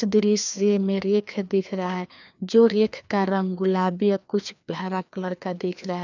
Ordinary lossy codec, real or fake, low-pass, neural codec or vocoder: none; fake; 7.2 kHz; codec, 16 kHz, 2 kbps, FreqCodec, larger model